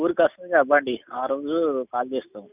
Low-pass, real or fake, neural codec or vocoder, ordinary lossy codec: 3.6 kHz; real; none; none